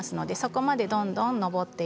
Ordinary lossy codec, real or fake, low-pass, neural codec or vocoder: none; real; none; none